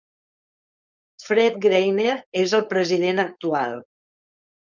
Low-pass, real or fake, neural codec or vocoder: 7.2 kHz; fake; codec, 16 kHz, 4.8 kbps, FACodec